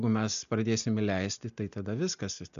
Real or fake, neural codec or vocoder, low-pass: real; none; 7.2 kHz